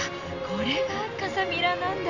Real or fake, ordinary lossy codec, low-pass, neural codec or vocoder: real; none; 7.2 kHz; none